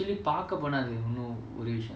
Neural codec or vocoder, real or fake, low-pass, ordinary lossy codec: none; real; none; none